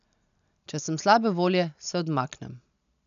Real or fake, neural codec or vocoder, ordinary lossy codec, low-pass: real; none; none; 7.2 kHz